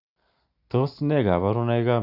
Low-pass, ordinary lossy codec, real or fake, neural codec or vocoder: 5.4 kHz; none; real; none